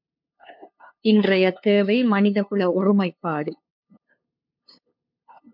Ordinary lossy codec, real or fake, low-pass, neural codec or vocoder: MP3, 48 kbps; fake; 5.4 kHz; codec, 16 kHz, 2 kbps, FunCodec, trained on LibriTTS, 25 frames a second